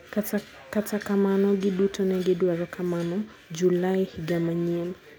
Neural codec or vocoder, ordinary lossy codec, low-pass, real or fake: none; none; none; real